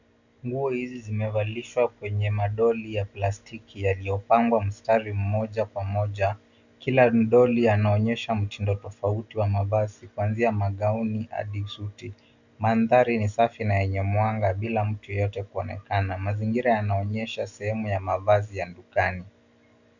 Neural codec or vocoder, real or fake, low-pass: none; real; 7.2 kHz